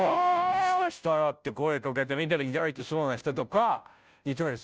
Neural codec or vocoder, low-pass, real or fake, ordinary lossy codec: codec, 16 kHz, 0.5 kbps, FunCodec, trained on Chinese and English, 25 frames a second; none; fake; none